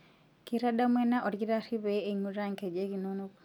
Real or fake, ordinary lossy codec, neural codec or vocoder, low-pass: real; none; none; 19.8 kHz